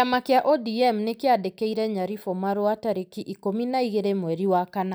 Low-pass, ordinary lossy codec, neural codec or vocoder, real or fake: none; none; none; real